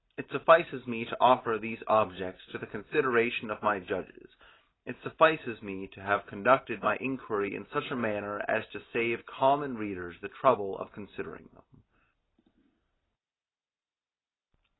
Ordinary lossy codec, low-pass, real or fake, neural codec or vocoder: AAC, 16 kbps; 7.2 kHz; real; none